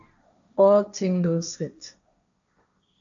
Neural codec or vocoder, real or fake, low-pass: codec, 16 kHz, 1.1 kbps, Voila-Tokenizer; fake; 7.2 kHz